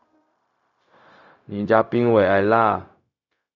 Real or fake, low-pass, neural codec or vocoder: fake; 7.2 kHz; codec, 16 kHz, 0.4 kbps, LongCat-Audio-Codec